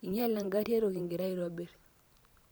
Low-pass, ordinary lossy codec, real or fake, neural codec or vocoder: none; none; fake; vocoder, 44.1 kHz, 128 mel bands every 512 samples, BigVGAN v2